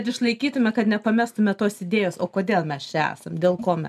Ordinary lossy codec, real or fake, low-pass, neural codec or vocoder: MP3, 96 kbps; real; 14.4 kHz; none